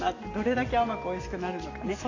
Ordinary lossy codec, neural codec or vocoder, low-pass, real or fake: AAC, 32 kbps; none; 7.2 kHz; real